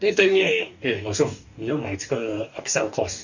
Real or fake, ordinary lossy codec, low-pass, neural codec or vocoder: fake; none; 7.2 kHz; codec, 44.1 kHz, 2.6 kbps, DAC